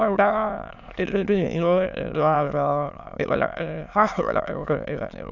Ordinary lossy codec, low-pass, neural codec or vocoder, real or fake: none; 7.2 kHz; autoencoder, 22.05 kHz, a latent of 192 numbers a frame, VITS, trained on many speakers; fake